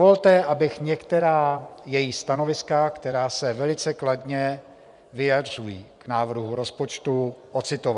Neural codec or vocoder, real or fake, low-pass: vocoder, 24 kHz, 100 mel bands, Vocos; fake; 10.8 kHz